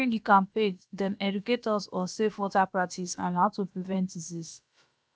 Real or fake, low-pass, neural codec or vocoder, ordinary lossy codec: fake; none; codec, 16 kHz, about 1 kbps, DyCAST, with the encoder's durations; none